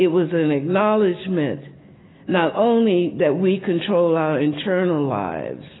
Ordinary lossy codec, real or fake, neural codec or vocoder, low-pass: AAC, 16 kbps; real; none; 7.2 kHz